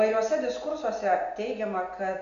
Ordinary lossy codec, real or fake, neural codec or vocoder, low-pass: AAC, 96 kbps; real; none; 7.2 kHz